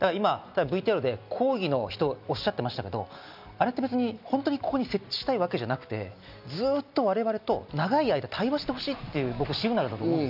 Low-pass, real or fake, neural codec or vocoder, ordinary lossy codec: 5.4 kHz; real; none; none